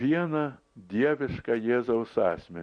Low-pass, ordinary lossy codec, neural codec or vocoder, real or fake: 9.9 kHz; MP3, 48 kbps; none; real